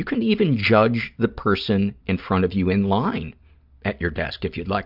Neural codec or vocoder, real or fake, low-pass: none; real; 5.4 kHz